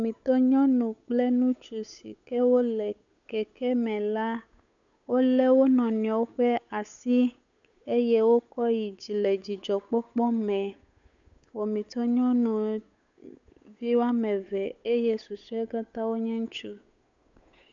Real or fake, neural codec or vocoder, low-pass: fake; codec, 16 kHz, 8 kbps, FunCodec, trained on Chinese and English, 25 frames a second; 7.2 kHz